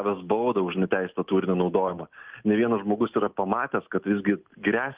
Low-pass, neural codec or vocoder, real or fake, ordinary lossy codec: 3.6 kHz; none; real; Opus, 32 kbps